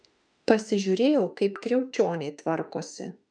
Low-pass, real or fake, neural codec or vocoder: 9.9 kHz; fake; autoencoder, 48 kHz, 32 numbers a frame, DAC-VAE, trained on Japanese speech